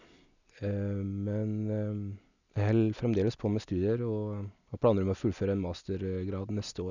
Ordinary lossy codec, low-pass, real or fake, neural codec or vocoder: none; 7.2 kHz; real; none